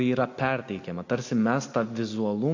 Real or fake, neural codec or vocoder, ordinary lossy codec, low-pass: real; none; AAC, 48 kbps; 7.2 kHz